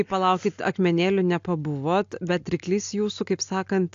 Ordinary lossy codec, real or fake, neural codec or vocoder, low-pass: AAC, 64 kbps; real; none; 7.2 kHz